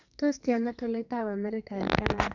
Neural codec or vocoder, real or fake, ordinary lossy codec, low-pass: codec, 44.1 kHz, 2.6 kbps, SNAC; fake; none; 7.2 kHz